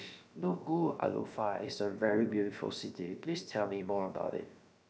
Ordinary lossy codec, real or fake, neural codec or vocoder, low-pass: none; fake; codec, 16 kHz, about 1 kbps, DyCAST, with the encoder's durations; none